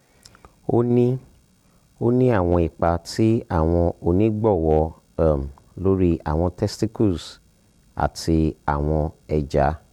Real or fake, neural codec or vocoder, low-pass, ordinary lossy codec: real; none; 19.8 kHz; MP3, 96 kbps